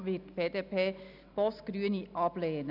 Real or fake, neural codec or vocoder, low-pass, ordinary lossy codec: real; none; 5.4 kHz; none